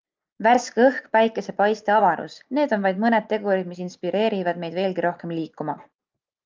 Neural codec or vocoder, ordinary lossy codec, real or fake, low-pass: none; Opus, 24 kbps; real; 7.2 kHz